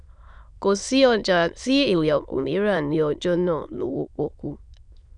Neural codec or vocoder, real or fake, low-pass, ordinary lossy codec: autoencoder, 22.05 kHz, a latent of 192 numbers a frame, VITS, trained on many speakers; fake; 9.9 kHz; none